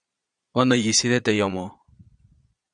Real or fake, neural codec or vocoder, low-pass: fake; vocoder, 22.05 kHz, 80 mel bands, Vocos; 9.9 kHz